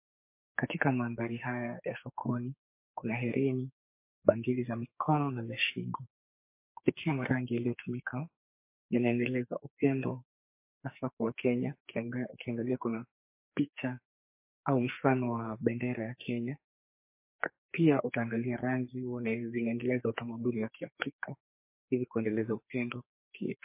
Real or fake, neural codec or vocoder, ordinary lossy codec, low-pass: fake; codec, 44.1 kHz, 2.6 kbps, SNAC; MP3, 24 kbps; 3.6 kHz